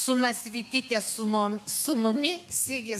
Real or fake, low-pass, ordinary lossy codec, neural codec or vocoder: fake; 14.4 kHz; AAC, 96 kbps; codec, 32 kHz, 1.9 kbps, SNAC